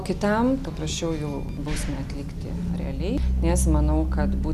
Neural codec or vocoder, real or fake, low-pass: none; real; 14.4 kHz